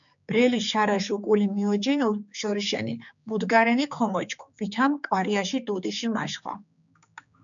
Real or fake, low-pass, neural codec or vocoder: fake; 7.2 kHz; codec, 16 kHz, 4 kbps, X-Codec, HuBERT features, trained on general audio